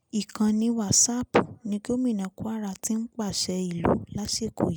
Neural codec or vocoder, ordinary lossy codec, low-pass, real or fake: none; none; none; real